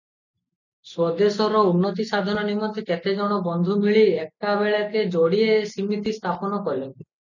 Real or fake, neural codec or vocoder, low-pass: real; none; 7.2 kHz